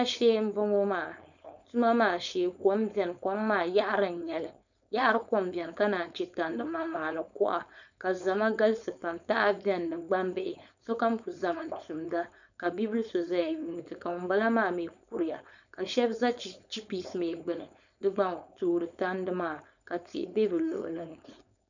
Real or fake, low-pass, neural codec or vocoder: fake; 7.2 kHz; codec, 16 kHz, 4.8 kbps, FACodec